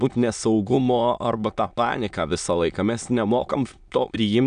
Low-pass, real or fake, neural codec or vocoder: 9.9 kHz; fake; autoencoder, 22.05 kHz, a latent of 192 numbers a frame, VITS, trained on many speakers